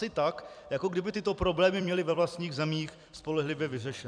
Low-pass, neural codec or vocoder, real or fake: 9.9 kHz; vocoder, 44.1 kHz, 128 mel bands every 256 samples, BigVGAN v2; fake